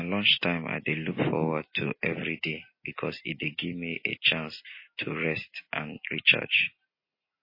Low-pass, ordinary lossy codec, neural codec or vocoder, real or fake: 5.4 kHz; MP3, 24 kbps; none; real